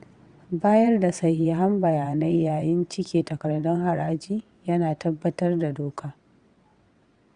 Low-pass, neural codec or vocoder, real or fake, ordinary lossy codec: 9.9 kHz; vocoder, 22.05 kHz, 80 mel bands, WaveNeXt; fake; Opus, 64 kbps